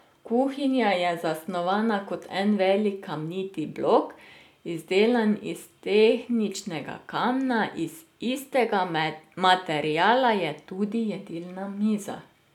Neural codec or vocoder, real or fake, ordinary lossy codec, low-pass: none; real; none; 19.8 kHz